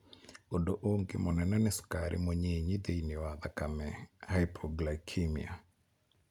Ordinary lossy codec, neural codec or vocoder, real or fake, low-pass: none; none; real; 19.8 kHz